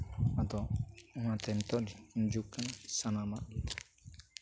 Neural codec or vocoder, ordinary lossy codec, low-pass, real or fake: none; none; none; real